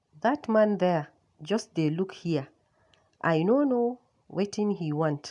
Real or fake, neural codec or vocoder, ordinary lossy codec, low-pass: real; none; none; 10.8 kHz